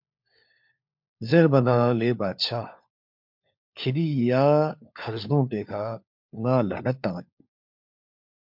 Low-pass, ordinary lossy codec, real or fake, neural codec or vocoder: 5.4 kHz; MP3, 48 kbps; fake; codec, 16 kHz, 4 kbps, FunCodec, trained on LibriTTS, 50 frames a second